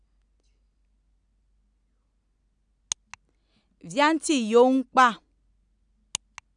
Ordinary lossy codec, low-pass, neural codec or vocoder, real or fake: none; 9.9 kHz; none; real